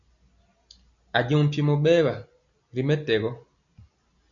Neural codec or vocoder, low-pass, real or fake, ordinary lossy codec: none; 7.2 kHz; real; MP3, 96 kbps